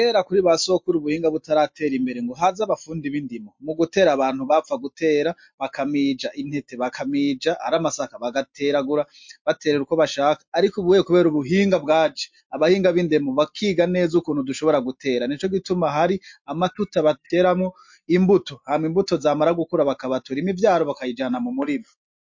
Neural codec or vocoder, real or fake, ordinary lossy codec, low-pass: none; real; MP3, 48 kbps; 7.2 kHz